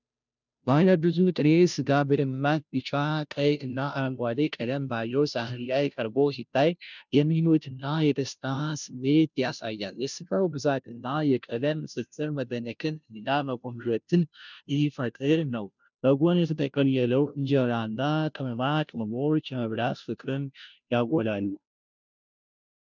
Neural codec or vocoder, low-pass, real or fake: codec, 16 kHz, 0.5 kbps, FunCodec, trained on Chinese and English, 25 frames a second; 7.2 kHz; fake